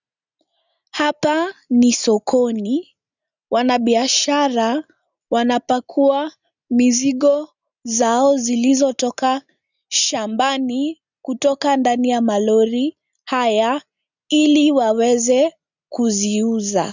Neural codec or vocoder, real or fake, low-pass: none; real; 7.2 kHz